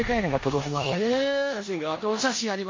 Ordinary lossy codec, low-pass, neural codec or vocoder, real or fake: MP3, 32 kbps; 7.2 kHz; codec, 16 kHz in and 24 kHz out, 0.9 kbps, LongCat-Audio-Codec, four codebook decoder; fake